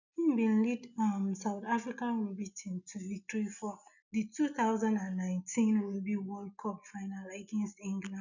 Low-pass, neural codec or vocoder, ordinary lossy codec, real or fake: 7.2 kHz; none; none; real